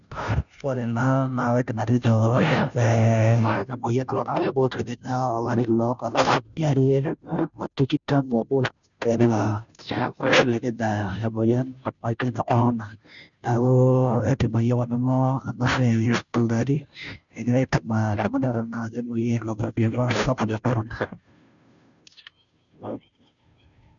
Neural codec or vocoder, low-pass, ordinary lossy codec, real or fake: codec, 16 kHz, 0.5 kbps, FunCodec, trained on Chinese and English, 25 frames a second; 7.2 kHz; none; fake